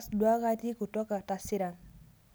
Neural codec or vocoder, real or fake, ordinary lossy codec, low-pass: none; real; none; none